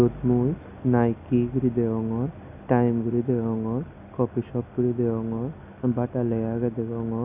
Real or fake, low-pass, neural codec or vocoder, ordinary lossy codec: real; 3.6 kHz; none; Opus, 64 kbps